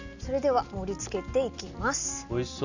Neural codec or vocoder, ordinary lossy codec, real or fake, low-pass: none; none; real; 7.2 kHz